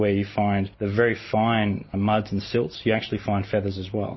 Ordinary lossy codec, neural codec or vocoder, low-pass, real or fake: MP3, 24 kbps; none; 7.2 kHz; real